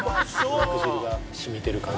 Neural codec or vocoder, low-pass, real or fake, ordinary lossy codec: none; none; real; none